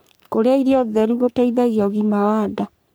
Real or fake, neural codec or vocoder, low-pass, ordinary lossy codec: fake; codec, 44.1 kHz, 3.4 kbps, Pupu-Codec; none; none